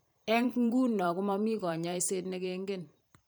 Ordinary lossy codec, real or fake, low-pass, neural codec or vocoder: none; fake; none; vocoder, 44.1 kHz, 128 mel bands every 512 samples, BigVGAN v2